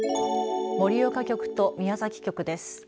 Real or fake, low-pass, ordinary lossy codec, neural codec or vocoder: real; none; none; none